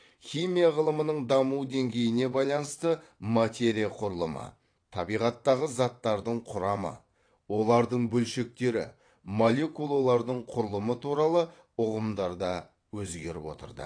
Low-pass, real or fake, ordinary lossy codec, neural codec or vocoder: 9.9 kHz; fake; AAC, 48 kbps; vocoder, 22.05 kHz, 80 mel bands, WaveNeXt